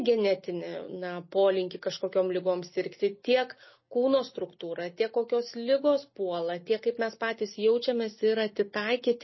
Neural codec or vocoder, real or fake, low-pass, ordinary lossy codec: none; real; 7.2 kHz; MP3, 24 kbps